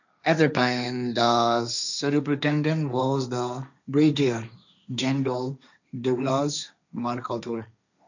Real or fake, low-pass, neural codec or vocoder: fake; 7.2 kHz; codec, 16 kHz, 1.1 kbps, Voila-Tokenizer